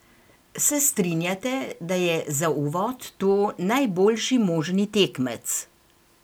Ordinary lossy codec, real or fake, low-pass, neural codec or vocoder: none; real; none; none